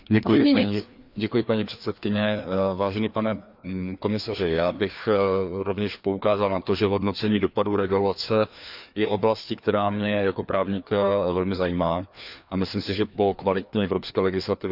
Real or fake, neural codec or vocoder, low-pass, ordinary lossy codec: fake; codec, 16 kHz, 2 kbps, FreqCodec, larger model; 5.4 kHz; none